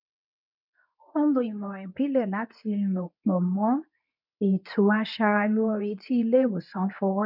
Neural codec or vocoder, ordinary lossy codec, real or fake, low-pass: codec, 24 kHz, 0.9 kbps, WavTokenizer, medium speech release version 2; none; fake; 5.4 kHz